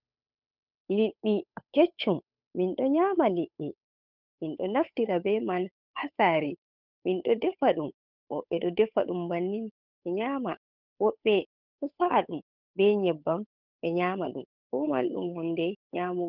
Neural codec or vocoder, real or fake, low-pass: codec, 16 kHz, 8 kbps, FunCodec, trained on Chinese and English, 25 frames a second; fake; 5.4 kHz